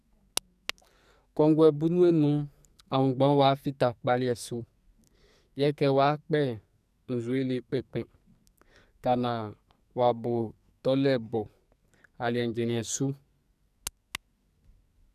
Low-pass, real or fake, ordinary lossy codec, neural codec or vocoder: 14.4 kHz; fake; none; codec, 44.1 kHz, 2.6 kbps, SNAC